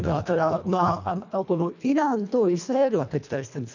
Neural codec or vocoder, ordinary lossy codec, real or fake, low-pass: codec, 24 kHz, 1.5 kbps, HILCodec; none; fake; 7.2 kHz